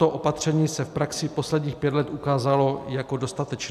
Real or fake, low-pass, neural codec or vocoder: real; 14.4 kHz; none